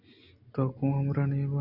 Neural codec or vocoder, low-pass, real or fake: none; 5.4 kHz; real